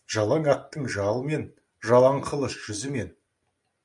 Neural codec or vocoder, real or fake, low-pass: none; real; 10.8 kHz